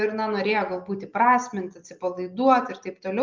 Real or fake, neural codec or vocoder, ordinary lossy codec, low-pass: real; none; Opus, 24 kbps; 7.2 kHz